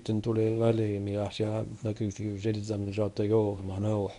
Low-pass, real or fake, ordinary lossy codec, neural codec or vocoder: 10.8 kHz; fake; none; codec, 24 kHz, 0.9 kbps, WavTokenizer, medium speech release version 1